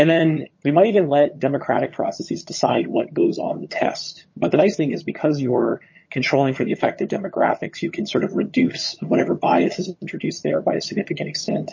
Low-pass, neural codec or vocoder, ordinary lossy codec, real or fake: 7.2 kHz; vocoder, 22.05 kHz, 80 mel bands, HiFi-GAN; MP3, 32 kbps; fake